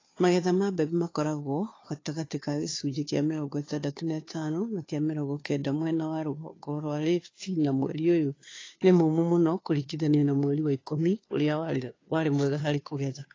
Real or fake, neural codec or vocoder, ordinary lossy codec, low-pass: fake; codec, 16 kHz, 2 kbps, FunCodec, trained on Chinese and English, 25 frames a second; AAC, 32 kbps; 7.2 kHz